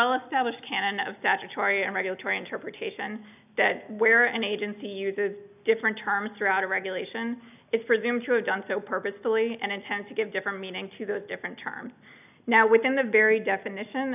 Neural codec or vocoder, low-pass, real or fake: none; 3.6 kHz; real